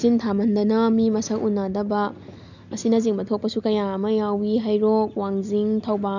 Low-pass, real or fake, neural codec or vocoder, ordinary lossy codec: 7.2 kHz; real; none; none